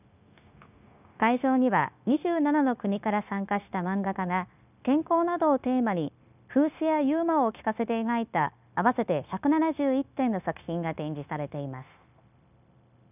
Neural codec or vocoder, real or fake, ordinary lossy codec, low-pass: codec, 16 kHz, 0.9 kbps, LongCat-Audio-Codec; fake; none; 3.6 kHz